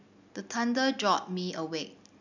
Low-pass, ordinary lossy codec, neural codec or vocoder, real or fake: 7.2 kHz; none; none; real